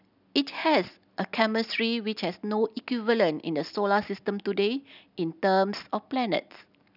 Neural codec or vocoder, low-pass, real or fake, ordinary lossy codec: none; 5.4 kHz; real; none